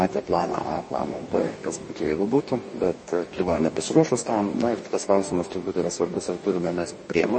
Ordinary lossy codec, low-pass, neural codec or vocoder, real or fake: MP3, 32 kbps; 9.9 kHz; codec, 44.1 kHz, 2.6 kbps, DAC; fake